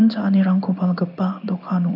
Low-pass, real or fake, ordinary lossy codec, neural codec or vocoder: 5.4 kHz; fake; none; codec, 16 kHz in and 24 kHz out, 1 kbps, XY-Tokenizer